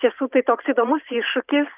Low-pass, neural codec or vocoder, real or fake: 3.6 kHz; vocoder, 44.1 kHz, 128 mel bands every 512 samples, BigVGAN v2; fake